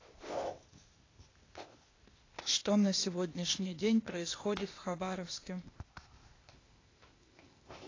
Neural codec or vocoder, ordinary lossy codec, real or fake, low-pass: codec, 16 kHz, 0.8 kbps, ZipCodec; AAC, 32 kbps; fake; 7.2 kHz